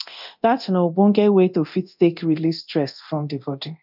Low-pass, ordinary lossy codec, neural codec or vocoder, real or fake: 5.4 kHz; none; codec, 24 kHz, 0.9 kbps, DualCodec; fake